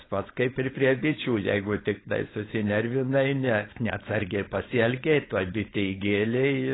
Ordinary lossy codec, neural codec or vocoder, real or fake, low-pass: AAC, 16 kbps; codec, 16 kHz, 4.8 kbps, FACodec; fake; 7.2 kHz